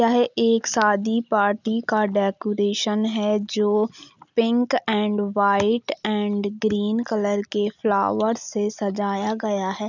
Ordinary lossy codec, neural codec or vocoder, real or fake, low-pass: none; none; real; 7.2 kHz